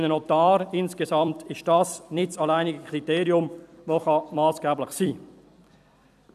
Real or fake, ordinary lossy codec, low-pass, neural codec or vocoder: fake; none; 14.4 kHz; vocoder, 44.1 kHz, 128 mel bands every 256 samples, BigVGAN v2